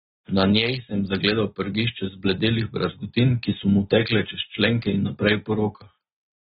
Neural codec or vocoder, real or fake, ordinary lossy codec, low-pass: none; real; AAC, 16 kbps; 7.2 kHz